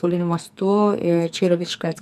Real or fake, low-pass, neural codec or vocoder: fake; 14.4 kHz; codec, 44.1 kHz, 2.6 kbps, SNAC